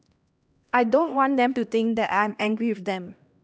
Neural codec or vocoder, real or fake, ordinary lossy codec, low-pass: codec, 16 kHz, 1 kbps, X-Codec, HuBERT features, trained on LibriSpeech; fake; none; none